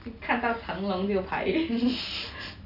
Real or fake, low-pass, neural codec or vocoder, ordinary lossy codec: real; 5.4 kHz; none; none